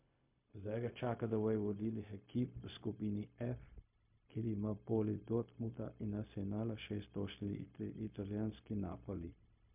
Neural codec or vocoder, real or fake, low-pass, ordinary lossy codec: codec, 16 kHz, 0.4 kbps, LongCat-Audio-Codec; fake; 3.6 kHz; MP3, 32 kbps